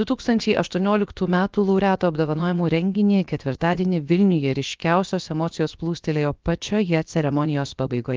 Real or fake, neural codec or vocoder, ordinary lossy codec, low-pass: fake; codec, 16 kHz, about 1 kbps, DyCAST, with the encoder's durations; Opus, 32 kbps; 7.2 kHz